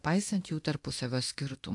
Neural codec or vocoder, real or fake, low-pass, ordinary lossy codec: codec, 24 kHz, 0.9 kbps, DualCodec; fake; 10.8 kHz; AAC, 64 kbps